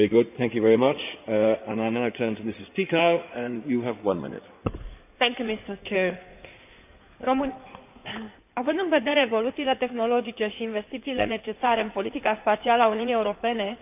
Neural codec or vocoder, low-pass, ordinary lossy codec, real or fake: codec, 16 kHz in and 24 kHz out, 2.2 kbps, FireRedTTS-2 codec; 3.6 kHz; none; fake